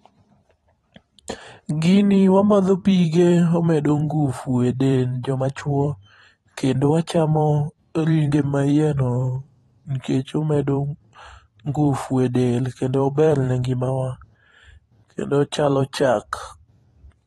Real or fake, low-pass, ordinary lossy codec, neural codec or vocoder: fake; 19.8 kHz; AAC, 32 kbps; vocoder, 44.1 kHz, 128 mel bands every 512 samples, BigVGAN v2